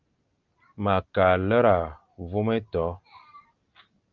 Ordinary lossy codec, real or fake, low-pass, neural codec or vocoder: Opus, 24 kbps; real; 7.2 kHz; none